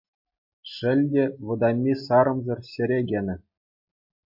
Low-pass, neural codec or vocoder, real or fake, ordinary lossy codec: 5.4 kHz; none; real; MP3, 32 kbps